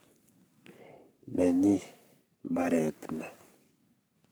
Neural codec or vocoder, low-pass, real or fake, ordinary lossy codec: codec, 44.1 kHz, 3.4 kbps, Pupu-Codec; none; fake; none